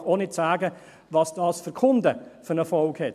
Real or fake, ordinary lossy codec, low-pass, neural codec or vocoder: real; MP3, 96 kbps; 14.4 kHz; none